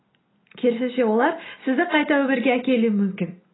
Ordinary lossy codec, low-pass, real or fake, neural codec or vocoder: AAC, 16 kbps; 7.2 kHz; real; none